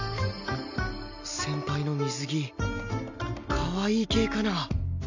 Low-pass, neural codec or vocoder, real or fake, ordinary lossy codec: 7.2 kHz; none; real; none